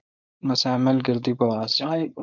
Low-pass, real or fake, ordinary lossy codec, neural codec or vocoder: 7.2 kHz; fake; MP3, 64 kbps; codec, 16 kHz, 4.8 kbps, FACodec